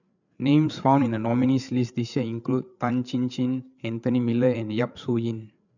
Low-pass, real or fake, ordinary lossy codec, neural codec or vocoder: 7.2 kHz; fake; none; codec, 16 kHz, 8 kbps, FreqCodec, larger model